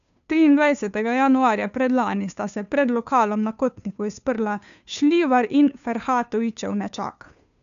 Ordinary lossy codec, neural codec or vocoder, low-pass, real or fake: none; codec, 16 kHz, 2 kbps, FunCodec, trained on Chinese and English, 25 frames a second; 7.2 kHz; fake